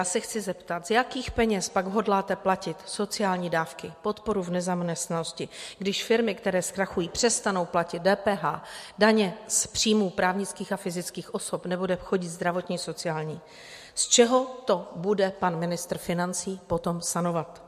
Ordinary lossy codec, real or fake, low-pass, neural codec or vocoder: MP3, 64 kbps; real; 14.4 kHz; none